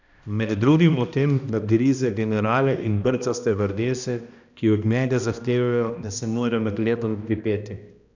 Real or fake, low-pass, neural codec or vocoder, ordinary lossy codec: fake; 7.2 kHz; codec, 16 kHz, 1 kbps, X-Codec, HuBERT features, trained on balanced general audio; none